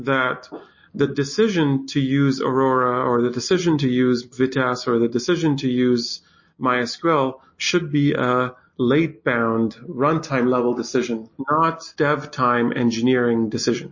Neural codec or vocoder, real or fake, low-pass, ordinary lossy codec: none; real; 7.2 kHz; MP3, 32 kbps